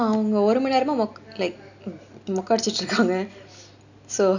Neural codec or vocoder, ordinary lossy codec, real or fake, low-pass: none; none; real; 7.2 kHz